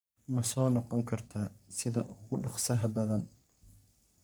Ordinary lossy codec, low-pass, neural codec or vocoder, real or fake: none; none; codec, 44.1 kHz, 3.4 kbps, Pupu-Codec; fake